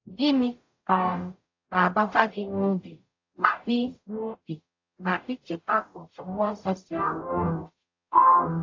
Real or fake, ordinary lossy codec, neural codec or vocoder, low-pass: fake; AAC, 48 kbps; codec, 44.1 kHz, 0.9 kbps, DAC; 7.2 kHz